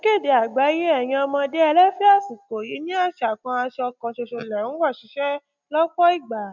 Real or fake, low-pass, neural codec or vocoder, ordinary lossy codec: real; 7.2 kHz; none; none